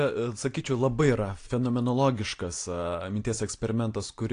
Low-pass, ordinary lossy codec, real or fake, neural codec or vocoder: 9.9 kHz; AAC, 48 kbps; real; none